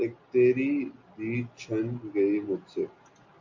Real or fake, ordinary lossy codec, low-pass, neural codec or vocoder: real; MP3, 48 kbps; 7.2 kHz; none